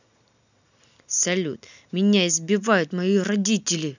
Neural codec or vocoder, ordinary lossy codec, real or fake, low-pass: none; none; real; 7.2 kHz